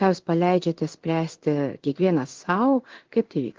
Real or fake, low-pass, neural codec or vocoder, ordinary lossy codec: fake; 7.2 kHz; codec, 16 kHz in and 24 kHz out, 1 kbps, XY-Tokenizer; Opus, 16 kbps